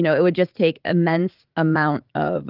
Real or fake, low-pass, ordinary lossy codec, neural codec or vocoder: fake; 5.4 kHz; Opus, 24 kbps; vocoder, 44.1 kHz, 80 mel bands, Vocos